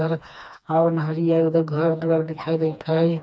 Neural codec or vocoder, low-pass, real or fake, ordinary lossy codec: codec, 16 kHz, 2 kbps, FreqCodec, smaller model; none; fake; none